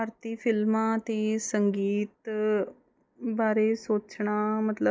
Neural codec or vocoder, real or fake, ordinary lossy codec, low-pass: none; real; none; none